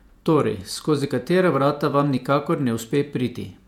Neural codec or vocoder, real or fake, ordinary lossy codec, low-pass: vocoder, 48 kHz, 128 mel bands, Vocos; fake; MP3, 96 kbps; 19.8 kHz